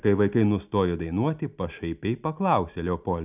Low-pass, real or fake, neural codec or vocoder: 3.6 kHz; real; none